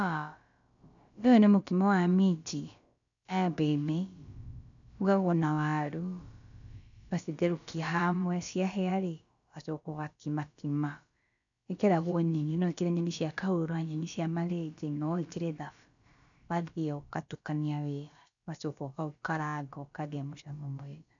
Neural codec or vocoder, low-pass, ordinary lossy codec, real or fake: codec, 16 kHz, about 1 kbps, DyCAST, with the encoder's durations; 7.2 kHz; none; fake